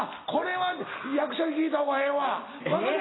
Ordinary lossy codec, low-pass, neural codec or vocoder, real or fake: AAC, 16 kbps; 7.2 kHz; none; real